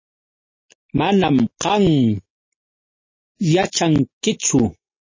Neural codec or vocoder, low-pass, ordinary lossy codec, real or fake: none; 7.2 kHz; MP3, 32 kbps; real